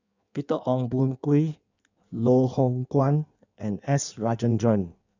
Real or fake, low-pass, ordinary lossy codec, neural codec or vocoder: fake; 7.2 kHz; none; codec, 16 kHz in and 24 kHz out, 1.1 kbps, FireRedTTS-2 codec